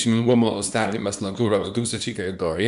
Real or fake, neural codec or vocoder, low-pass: fake; codec, 24 kHz, 0.9 kbps, WavTokenizer, small release; 10.8 kHz